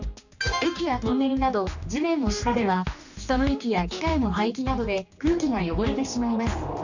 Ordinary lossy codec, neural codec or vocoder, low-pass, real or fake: none; codec, 16 kHz, 2 kbps, X-Codec, HuBERT features, trained on general audio; 7.2 kHz; fake